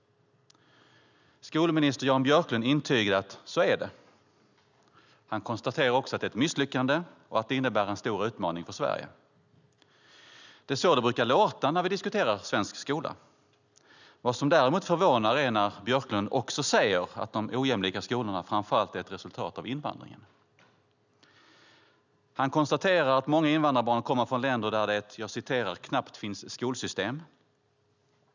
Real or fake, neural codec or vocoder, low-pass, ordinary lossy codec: real; none; 7.2 kHz; none